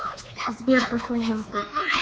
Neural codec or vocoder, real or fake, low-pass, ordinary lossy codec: codec, 16 kHz, 2 kbps, X-Codec, WavLM features, trained on Multilingual LibriSpeech; fake; none; none